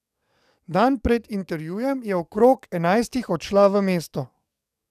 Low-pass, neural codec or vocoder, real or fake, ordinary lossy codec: 14.4 kHz; codec, 44.1 kHz, 7.8 kbps, DAC; fake; none